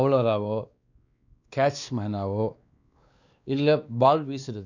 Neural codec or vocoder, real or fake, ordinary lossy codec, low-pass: codec, 16 kHz, 2 kbps, X-Codec, WavLM features, trained on Multilingual LibriSpeech; fake; none; 7.2 kHz